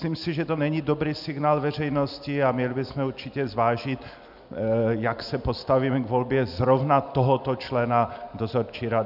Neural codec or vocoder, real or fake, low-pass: none; real; 5.4 kHz